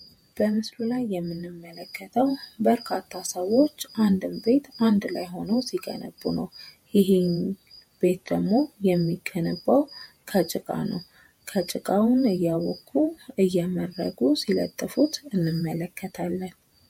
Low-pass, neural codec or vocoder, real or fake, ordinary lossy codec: 19.8 kHz; vocoder, 48 kHz, 128 mel bands, Vocos; fake; MP3, 64 kbps